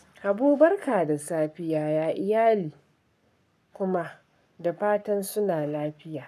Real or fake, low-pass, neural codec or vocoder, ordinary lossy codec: fake; 14.4 kHz; codec, 44.1 kHz, 7.8 kbps, Pupu-Codec; none